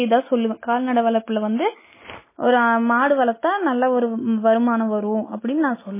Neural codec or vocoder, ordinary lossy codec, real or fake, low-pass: none; MP3, 16 kbps; real; 3.6 kHz